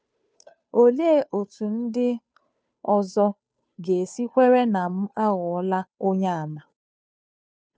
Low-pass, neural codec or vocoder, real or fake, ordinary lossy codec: none; codec, 16 kHz, 2 kbps, FunCodec, trained on Chinese and English, 25 frames a second; fake; none